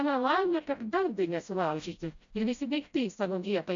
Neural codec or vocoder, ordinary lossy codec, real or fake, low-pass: codec, 16 kHz, 0.5 kbps, FreqCodec, smaller model; MP3, 64 kbps; fake; 7.2 kHz